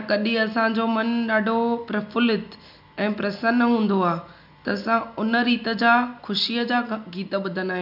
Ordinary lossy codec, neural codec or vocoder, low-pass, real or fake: none; none; 5.4 kHz; real